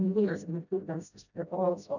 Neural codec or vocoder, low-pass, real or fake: codec, 16 kHz, 0.5 kbps, FreqCodec, smaller model; 7.2 kHz; fake